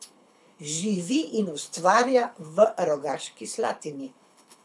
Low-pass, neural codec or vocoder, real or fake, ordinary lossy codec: none; codec, 24 kHz, 6 kbps, HILCodec; fake; none